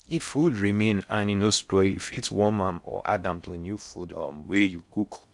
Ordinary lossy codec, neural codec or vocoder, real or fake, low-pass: none; codec, 16 kHz in and 24 kHz out, 0.6 kbps, FocalCodec, streaming, 4096 codes; fake; 10.8 kHz